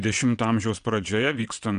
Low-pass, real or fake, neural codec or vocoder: 9.9 kHz; fake; vocoder, 22.05 kHz, 80 mel bands, WaveNeXt